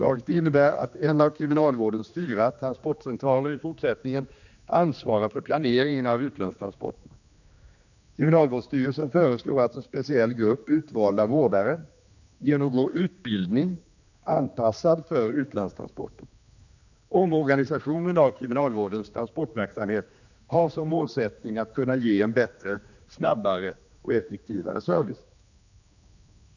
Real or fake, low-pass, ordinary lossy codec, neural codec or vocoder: fake; 7.2 kHz; none; codec, 16 kHz, 2 kbps, X-Codec, HuBERT features, trained on general audio